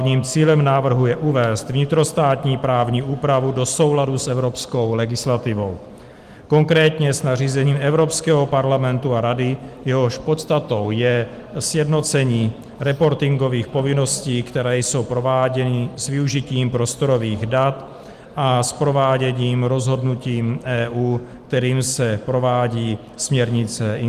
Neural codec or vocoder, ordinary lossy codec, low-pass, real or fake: none; Opus, 24 kbps; 14.4 kHz; real